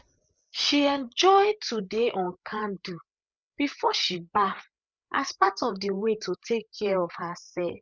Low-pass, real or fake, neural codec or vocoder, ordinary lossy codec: none; fake; codec, 16 kHz, 8 kbps, FreqCodec, larger model; none